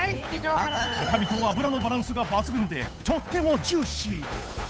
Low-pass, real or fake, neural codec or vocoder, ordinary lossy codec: none; fake; codec, 16 kHz, 8 kbps, FunCodec, trained on Chinese and English, 25 frames a second; none